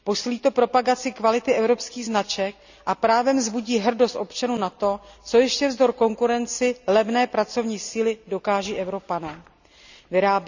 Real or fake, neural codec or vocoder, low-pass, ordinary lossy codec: real; none; 7.2 kHz; none